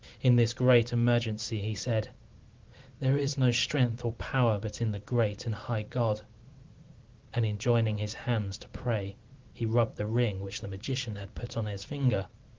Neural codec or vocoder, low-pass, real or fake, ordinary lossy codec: vocoder, 44.1 kHz, 128 mel bands every 512 samples, BigVGAN v2; 7.2 kHz; fake; Opus, 16 kbps